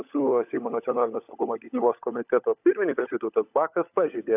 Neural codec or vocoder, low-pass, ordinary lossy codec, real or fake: codec, 16 kHz, 16 kbps, FunCodec, trained on LibriTTS, 50 frames a second; 3.6 kHz; AAC, 32 kbps; fake